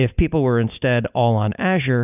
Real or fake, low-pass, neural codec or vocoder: real; 3.6 kHz; none